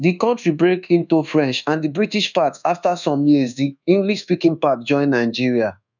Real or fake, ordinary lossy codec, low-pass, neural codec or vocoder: fake; none; 7.2 kHz; codec, 24 kHz, 1.2 kbps, DualCodec